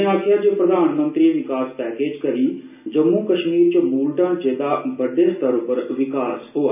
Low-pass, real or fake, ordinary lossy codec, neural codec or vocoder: 3.6 kHz; real; none; none